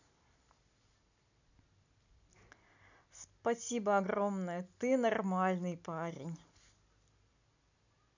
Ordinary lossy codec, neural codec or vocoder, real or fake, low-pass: none; none; real; 7.2 kHz